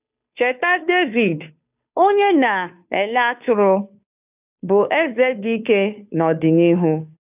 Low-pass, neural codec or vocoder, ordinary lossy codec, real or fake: 3.6 kHz; codec, 16 kHz, 2 kbps, FunCodec, trained on Chinese and English, 25 frames a second; none; fake